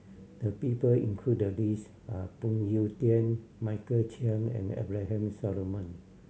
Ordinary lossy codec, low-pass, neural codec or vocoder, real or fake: none; none; none; real